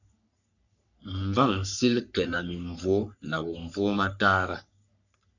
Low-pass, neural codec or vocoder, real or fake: 7.2 kHz; codec, 44.1 kHz, 3.4 kbps, Pupu-Codec; fake